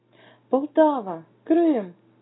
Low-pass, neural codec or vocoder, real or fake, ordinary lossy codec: 7.2 kHz; none; real; AAC, 16 kbps